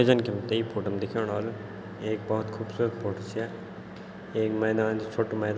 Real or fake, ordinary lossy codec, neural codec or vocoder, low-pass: real; none; none; none